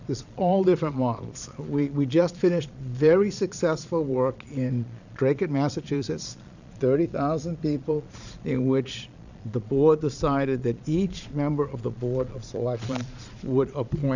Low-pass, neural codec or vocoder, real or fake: 7.2 kHz; vocoder, 22.05 kHz, 80 mel bands, Vocos; fake